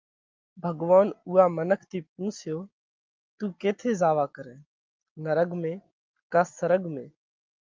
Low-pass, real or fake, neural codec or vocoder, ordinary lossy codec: 7.2 kHz; real; none; Opus, 32 kbps